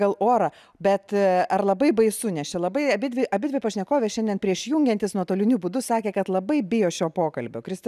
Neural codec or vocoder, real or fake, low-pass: none; real; 14.4 kHz